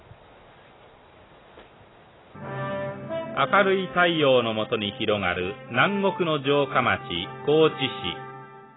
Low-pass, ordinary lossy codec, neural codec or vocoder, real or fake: 7.2 kHz; AAC, 16 kbps; none; real